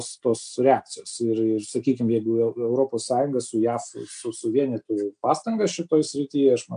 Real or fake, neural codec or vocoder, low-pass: real; none; 9.9 kHz